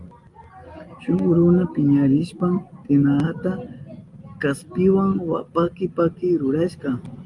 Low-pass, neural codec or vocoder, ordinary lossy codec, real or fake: 10.8 kHz; none; Opus, 32 kbps; real